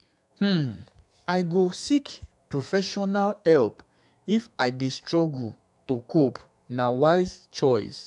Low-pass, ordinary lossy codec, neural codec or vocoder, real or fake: 10.8 kHz; none; codec, 32 kHz, 1.9 kbps, SNAC; fake